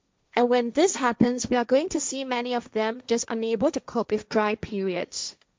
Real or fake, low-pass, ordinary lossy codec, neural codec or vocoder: fake; none; none; codec, 16 kHz, 1.1 kbps, Voila-Tokenizer